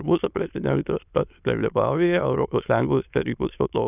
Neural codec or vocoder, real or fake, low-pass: autoencoder, 22.05 kHz, a latent of 192 numbers a frame, VITS, trained on many speakers; fake; 3.6 kHz